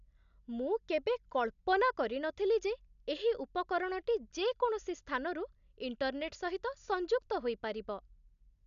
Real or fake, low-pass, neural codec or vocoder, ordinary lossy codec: real; 7.2 kHz; none; none